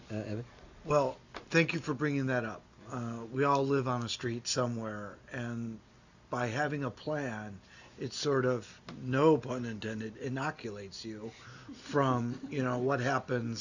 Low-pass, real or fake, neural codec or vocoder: 7.2 kHz; real; none